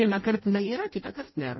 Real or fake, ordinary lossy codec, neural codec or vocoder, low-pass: fake; MP3, 24 kbps; codec, 16 kHz in and 24 kHz out, 0.6 kbps, FireRedTTS-2 codec; 7.2 kHz